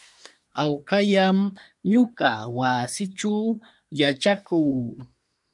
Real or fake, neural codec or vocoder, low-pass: fake; codec, 24 kHz, 1 kbps, SNAC; 10.8 kHz